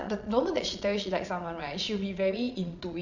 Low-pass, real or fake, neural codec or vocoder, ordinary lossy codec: 7.2 kHz; fake; vocoder, 22.05 kHz, 80 mel bands, Vocos; none